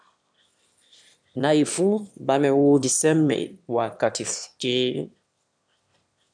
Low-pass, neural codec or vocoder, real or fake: 9.9 kHz; autoencoder, 22.05 kHz, a latent of 192 numbers a frame, VITS, trained on one speaker; fake